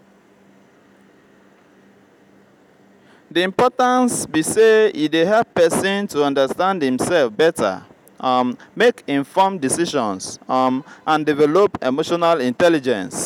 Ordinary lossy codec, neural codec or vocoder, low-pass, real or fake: none; none; 19.8 kHz; real